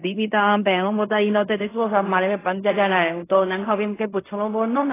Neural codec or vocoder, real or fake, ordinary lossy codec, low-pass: codec, 16 kHz in and 24 kHz out, 0.4 kbps, LongCat-Audio-Codec, fine tuned four codebook decoder; fake; AAC, 16 kbps; 3.6 kHz